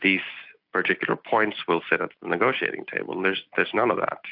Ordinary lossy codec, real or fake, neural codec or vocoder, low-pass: AAC, 48 kbps; real; none; 5.4 kHz